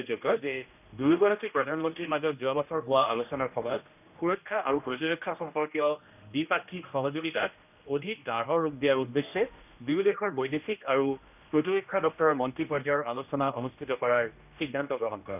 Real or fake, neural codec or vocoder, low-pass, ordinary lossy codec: fake; codec, 16 kHz, 1 kbps, X-Codec, HuBERT features, trained on general audio; 3.6 kHz; none